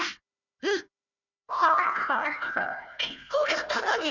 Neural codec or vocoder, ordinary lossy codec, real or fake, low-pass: codec, 16 kHz, 1 kbps, FunCodec, trained on Chinese and English, 50 frames a second; none; fake; 7.2 kHz